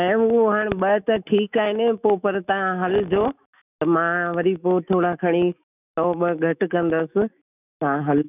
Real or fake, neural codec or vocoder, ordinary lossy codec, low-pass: fake; autoencoder, 48 kHz, 128 numbers a frame, DAC-VAE, trained on Japanese speech; none; 3.6 kHz